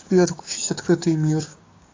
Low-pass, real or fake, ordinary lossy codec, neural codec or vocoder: 7.2 kHz; fake; AAC, 32 kbps; codec, 16 kHz, 6 kbps, DAC